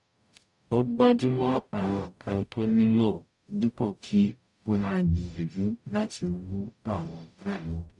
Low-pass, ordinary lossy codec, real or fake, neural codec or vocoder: 10.8 kHz; none; fake; codec, 44.1 kHz, 0.9 kbps, DAC